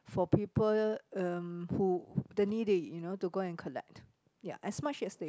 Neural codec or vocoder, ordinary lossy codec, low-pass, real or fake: none; none; none; real